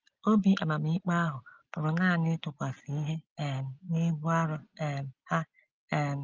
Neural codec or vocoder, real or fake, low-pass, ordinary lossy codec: none; real; 7.2 kHz; Opus, 32 kbps